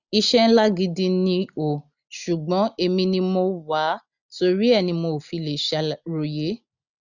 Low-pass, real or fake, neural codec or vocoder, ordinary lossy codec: 7.2 kHz; real; none; none